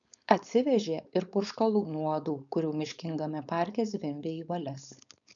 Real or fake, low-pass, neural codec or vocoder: fake; 7.2 kHz; codec, 16 kHz, 4.8 kbps, FACodec